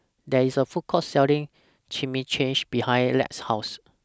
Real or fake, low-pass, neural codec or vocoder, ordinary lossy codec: real; none; none; none